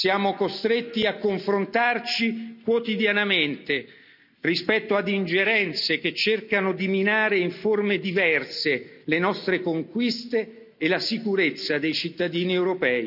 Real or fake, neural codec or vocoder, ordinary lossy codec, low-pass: real; none; none; 5.4 kHz